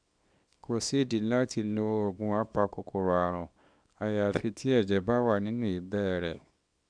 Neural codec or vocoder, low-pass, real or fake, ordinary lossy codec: codec, 24 kHz, 0.9 kbps, WavTokenizer, small release; 9.9 kHz; fake; none